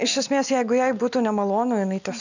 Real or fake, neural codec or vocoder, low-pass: real; none; 7.2 kHz